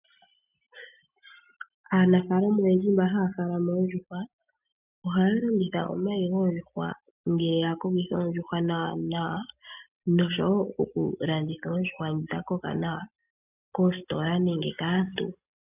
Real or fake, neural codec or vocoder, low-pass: real; none; 3.6 kHz